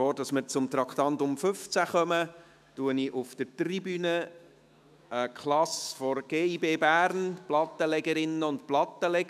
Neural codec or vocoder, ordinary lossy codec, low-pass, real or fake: autoencoder, 48 kHz, 128 numbers a frame, DAC-VAE, trained on Japanese speech; none; 14.4 kHz; fake